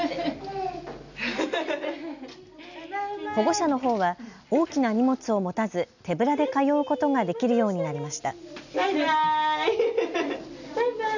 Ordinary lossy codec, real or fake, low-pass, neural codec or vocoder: none; real; 7.2 kHz; none